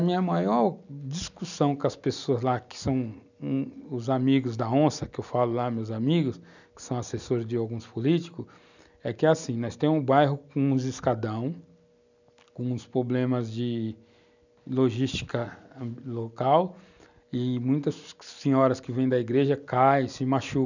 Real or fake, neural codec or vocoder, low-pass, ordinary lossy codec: real; none; 7.2 kHz; none